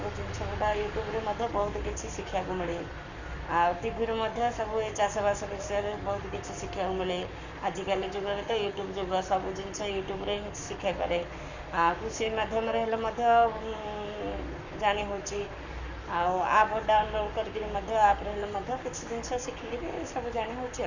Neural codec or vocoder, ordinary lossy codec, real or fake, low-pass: codec, 44.1 kHz, 7.8 kbps, Pupu-Codec; none; fake; 7.2 kHz